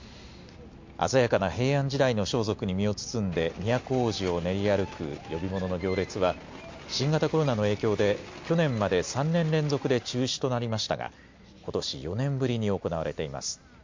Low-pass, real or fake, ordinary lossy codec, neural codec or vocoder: 7.2 kHz; real; MP3, 48 kbps; none